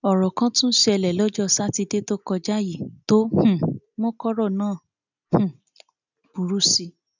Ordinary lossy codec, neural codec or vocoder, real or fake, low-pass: none; none; real; 7.2 kHz